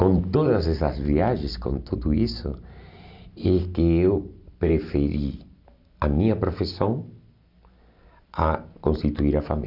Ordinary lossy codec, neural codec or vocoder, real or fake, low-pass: none; none; real; 5.4 kHz